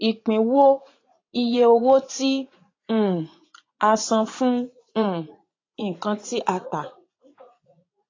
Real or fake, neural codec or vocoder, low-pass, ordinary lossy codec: fake; vocoder, 44.1 kHz, 80 mel bands, Vocos; 7.2 kHz; AAC, 32 kbps